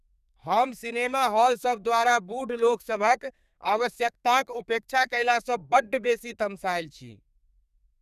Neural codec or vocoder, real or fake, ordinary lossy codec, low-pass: codec, 32 kHz, 1.9 kbps, SNAC; fake; none; 14.4 kHz